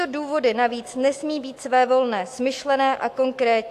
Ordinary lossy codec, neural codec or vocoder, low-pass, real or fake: AAC, 64 kbps; autoencoder, 48 kHz, 128 numbers a frame, DAC-VAE, trained on Japanese speech; 14.4 kHz; fake